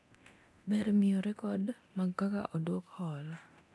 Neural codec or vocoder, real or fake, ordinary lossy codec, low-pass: codec, 24 kHz, 0.9 kbps, DualCodec; fake; none; none